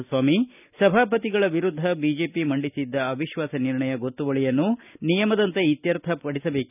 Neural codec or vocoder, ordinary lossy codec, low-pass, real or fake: none; none; 3.6 kHz; real